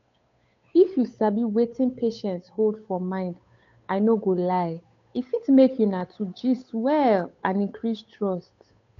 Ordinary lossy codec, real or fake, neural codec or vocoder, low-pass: AAC, 48 kbps; fake; codec, 16 kHz, 8 kbps, FunCodec, trained on Chinese and English, 25 frames a second; 7.2 kHz